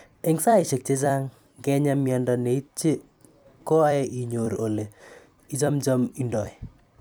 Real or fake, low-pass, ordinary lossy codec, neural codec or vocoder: fake; none; none; vocoder, 44.1 kHz, 128 mel bands every 256 samples, BigVGAN v2